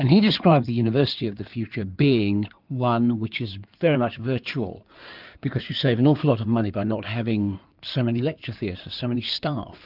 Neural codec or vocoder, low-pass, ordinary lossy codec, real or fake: codec, 16 kHz in and 24 kHz out, 2.2 kbps, FireRedTTS-2 codec; 5.4 kHz; Opus, 32 kbps; fake